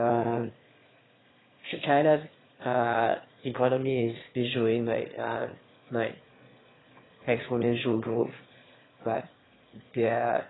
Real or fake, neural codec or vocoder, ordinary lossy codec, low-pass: fake; autoencoder, 22.05 kHz, a latent of 192 numbers a frame, VITS, trained on one speaker; AAC, 16 kbps; 7.2 kHz